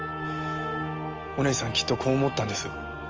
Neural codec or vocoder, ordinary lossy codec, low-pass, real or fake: none; Opus, 24 kbps; 7.2 kHz; real